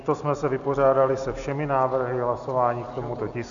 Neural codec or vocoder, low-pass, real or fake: none; 7.2 kHz; real